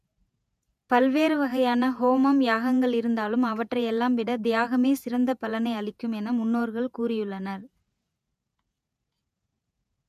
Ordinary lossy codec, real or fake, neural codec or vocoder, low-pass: none; fake; vocoder, 48 kHz, 128 mel bands, Vocos; 14.4 kHz